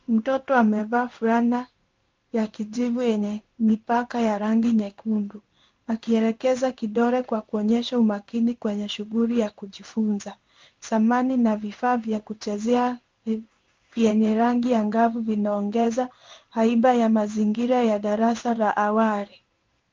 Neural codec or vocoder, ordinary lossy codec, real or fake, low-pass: codec, 16 kHz in and 24 kHz out, 1 kbps, XY-Tokenizer; Opus, 24 kbps; fake; 7.2 kHz